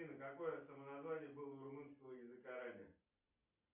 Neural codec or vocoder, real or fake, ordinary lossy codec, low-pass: none; real; Opus, 64 kbps; 3.6 kHz